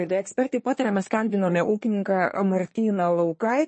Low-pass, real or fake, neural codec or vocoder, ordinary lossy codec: 9.9 kHz; fake; codec, 24 kHz, 1 kbps, SNAC; MP3, 32 kbps